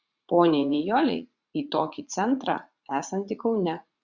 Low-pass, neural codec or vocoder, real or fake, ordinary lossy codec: 7.2 kHz; vocoder, 44.1 kHz, 80 mel bands, Vocos; fake; Opus, 64 kbps